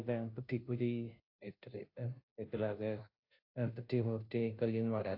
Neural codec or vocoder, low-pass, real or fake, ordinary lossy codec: codec, 16 kHz, 0.5 kbps, FunCodec, trained on Chinese and English, 25 frames a second; 5.4 kHz; fake; AAC, 48 kbps